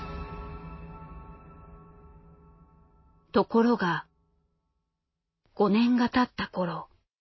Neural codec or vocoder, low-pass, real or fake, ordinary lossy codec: none; 7.2 kHz; real; MP3, 24 kbps